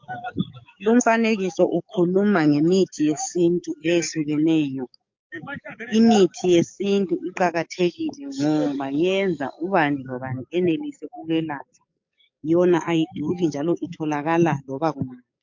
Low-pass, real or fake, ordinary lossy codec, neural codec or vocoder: 7.2 kHz; fake; MP3, 48 kbps; codec, 44.1 kHz, 7.8 kbps, DAC